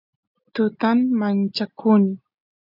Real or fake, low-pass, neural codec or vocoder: real; 5.4 kHz; none